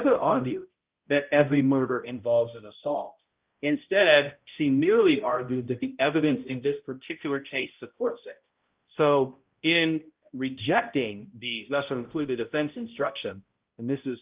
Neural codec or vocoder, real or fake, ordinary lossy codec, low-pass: codec, 16 kHz, 0.5 kbps, X-Codec, HuBERT features, trained on balanced general audio; fake; Opus, 32 kbps; 3.6 kHz